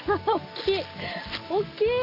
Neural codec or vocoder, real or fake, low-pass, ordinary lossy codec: none; real; 5.4 kHz; none